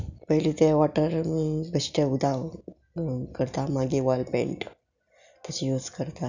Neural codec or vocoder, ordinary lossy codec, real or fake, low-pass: none; none; real; 7.2 kHz